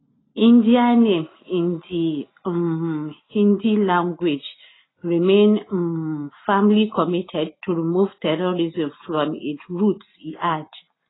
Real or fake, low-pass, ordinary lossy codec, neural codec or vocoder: real; 7.2 kHz; AAC, 16 kbps; none